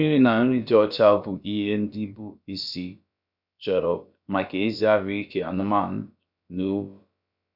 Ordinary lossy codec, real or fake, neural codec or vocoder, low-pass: none; fake; codec, 16 kHz, about 1 kbps, DyCAST, with the encoder's durations; 5.4 kHz